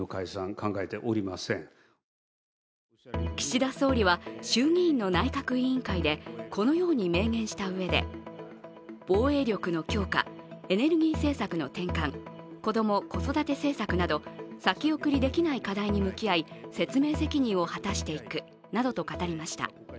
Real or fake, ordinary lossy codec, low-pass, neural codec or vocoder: real; none; none; none